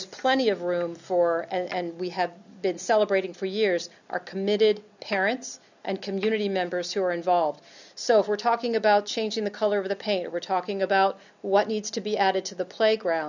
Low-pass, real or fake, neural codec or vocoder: 7.2 kHz; real; none